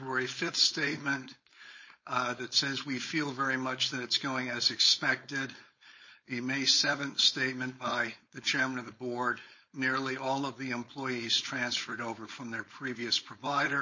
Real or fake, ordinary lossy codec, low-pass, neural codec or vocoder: fake; MP3, 32 kbps; 7.2 kHz; codec, 16 kHz, 4.8 kbps, FACodec